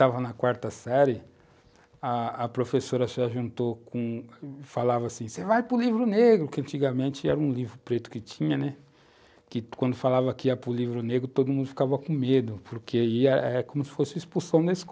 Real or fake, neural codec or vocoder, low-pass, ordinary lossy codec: real; none; none; none